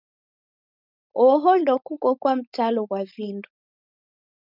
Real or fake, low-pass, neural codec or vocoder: fake; 5.4 kHz; codec, 16 kHz, 4.8 kbps, FACodec